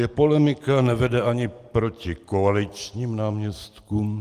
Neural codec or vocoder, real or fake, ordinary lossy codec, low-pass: none; real; Opus, 24 kbps; 14.4 kHz